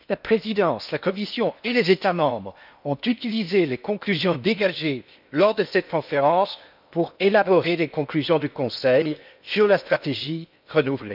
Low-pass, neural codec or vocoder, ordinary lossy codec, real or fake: 5.4 kHz; codec, 16 kHz in and 24 kHz out, 0.8 kbps, FocalCodec, streaming, 65536 codes; none; fake